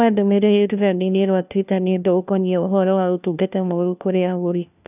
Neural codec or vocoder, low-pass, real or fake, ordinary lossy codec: codec, 16 kHz, 1 kbps, FunCodec, trained on LibriTTS, 50 frames a second; 3.6 kHz; fake; none